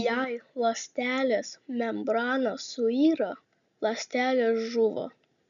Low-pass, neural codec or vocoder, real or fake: 7.2 kHz; none; real